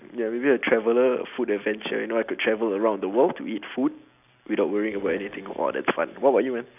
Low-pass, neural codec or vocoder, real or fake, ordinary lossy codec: 3.6 kHz; none; real; none